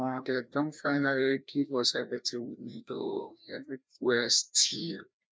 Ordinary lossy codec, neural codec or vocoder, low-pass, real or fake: none; codec, 16 kHz, 1 kbps, FreqCodec, larger model; none; fake